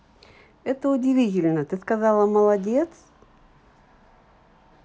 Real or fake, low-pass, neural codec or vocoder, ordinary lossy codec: real; none; none; none